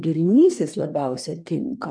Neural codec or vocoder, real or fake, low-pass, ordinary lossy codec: codec, 24 kHz, 1 kbps, SNAC; fake; 9.9 kHz; MP3, 96 kbps